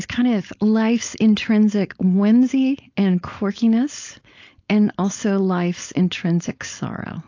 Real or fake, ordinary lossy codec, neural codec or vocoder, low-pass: fake; AAC, 48 kbps; codec, 16 kHz, 4.8 kbps, FACodec; 7.2 kHz